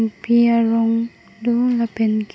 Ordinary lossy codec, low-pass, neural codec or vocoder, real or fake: none; none; none; real